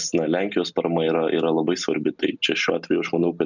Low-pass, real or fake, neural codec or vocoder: 7.2 kHz; real; none